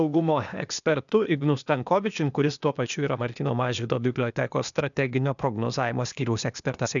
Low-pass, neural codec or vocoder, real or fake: 7.2 kHz; codec, 16 kHz, 0.8 kbps, ZipCodec; fake